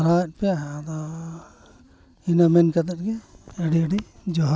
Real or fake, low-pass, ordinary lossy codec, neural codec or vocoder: real; none; none; none